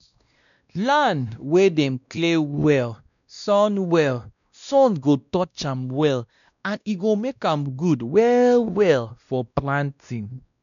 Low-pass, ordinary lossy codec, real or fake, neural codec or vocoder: 7.2 kHz; none; fake; codec, 16 kHz, 1 kbps, X-Codec, WavLM features, trained on Multilingual LibriSpeech